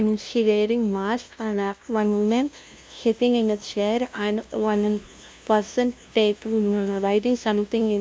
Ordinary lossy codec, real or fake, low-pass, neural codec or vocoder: none; fake; none; codec, 16 kHz, 0.5 kbps, FunCodec, trained on LibriTTS, 25 frames a second